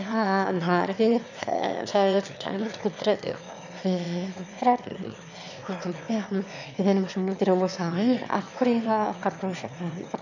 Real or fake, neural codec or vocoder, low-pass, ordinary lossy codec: fake; autoencoder, 22.05 kHz, a latent of 192 numbers a frame, VITS, trained on one speaker; 7.2 kHz; none